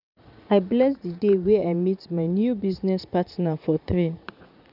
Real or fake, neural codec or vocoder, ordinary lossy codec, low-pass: fake; vocoder, 44.1 kHz, 80 mel bands, Vocos; none; 5.4 kHz